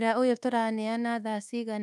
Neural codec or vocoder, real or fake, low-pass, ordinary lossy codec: codec, 24 kHz, 1.2 kbps, DualCodec; fake; none; none